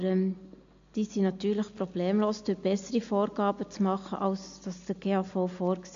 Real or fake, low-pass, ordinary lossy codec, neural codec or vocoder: real; 7.2 kHz; AAC, 64 kbps; none